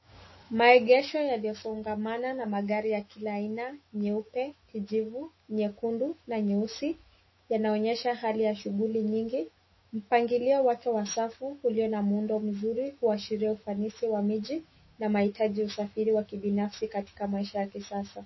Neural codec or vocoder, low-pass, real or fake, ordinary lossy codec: autoencoder, 48 kHz, 128 numbers a frame, DAC-VAE, trained on Japanese speech; 7.2 kHz; fake; MP3, 24 kbps